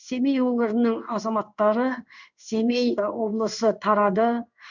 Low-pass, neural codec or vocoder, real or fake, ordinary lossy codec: 7.2 kHz; codec, 16 kHz in and 24 kHz out, 1 kbps, XY-Tokenizer; fake; none